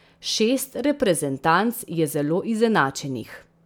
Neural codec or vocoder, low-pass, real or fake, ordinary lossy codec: none; none; real; none